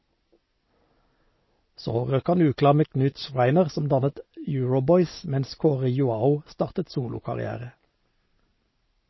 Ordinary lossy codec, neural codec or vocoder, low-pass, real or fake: MP3, 24 kbps; none; 7.2 kHz; real